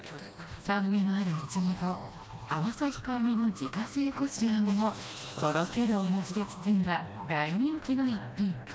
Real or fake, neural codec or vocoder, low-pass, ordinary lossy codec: fake; codec, 16 kHz, 1 kbps, FreqCodec, smaller model; none; none